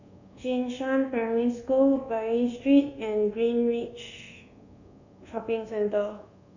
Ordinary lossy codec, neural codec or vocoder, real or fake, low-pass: none; codec, 24 kHz, 1.2 kbps, DualCodec; fake; 7.2 kHz